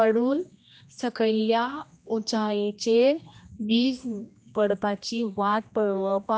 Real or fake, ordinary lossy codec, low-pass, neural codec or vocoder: fake; none; none; codec, 16 kHz, 1 kbps, X-Codec, HuBERT features, trained on general audio